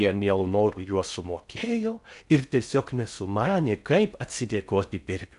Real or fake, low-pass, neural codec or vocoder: fake; 10.8 kHz; codec, 16 kHz in and 24 kHz out, 0.6 kbps, FocalCodec, streaming, 4096 codes